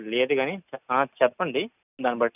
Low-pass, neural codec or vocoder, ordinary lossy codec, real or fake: 3.6 kHz; none; none; real